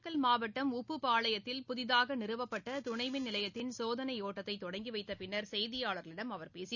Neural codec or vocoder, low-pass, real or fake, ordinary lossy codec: none; 7.2 kHz; real; none